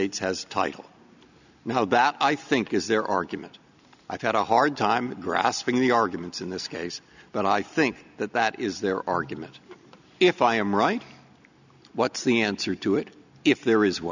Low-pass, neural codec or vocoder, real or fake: 7.2 kHz; none; real